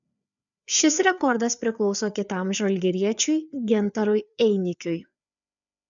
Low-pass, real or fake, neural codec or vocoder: 7.2 kHz; fake; codec, 16 kHz, 4 kbps, FreqCodec, larger model